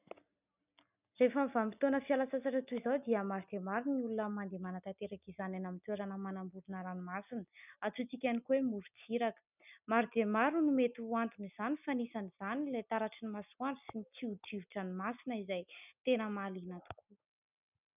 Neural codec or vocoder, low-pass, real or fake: none; 3.6 kHz; real